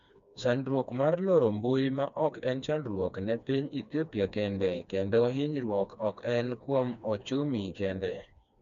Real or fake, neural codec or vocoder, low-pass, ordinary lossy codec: fake; codec, 16 kHz, 2 kbps, FreqCodec, smaller model; 7.2 kHz; none